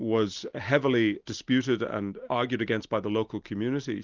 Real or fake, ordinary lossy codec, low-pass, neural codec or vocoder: real; Opus, 24 kbps; 7.2 kHz; none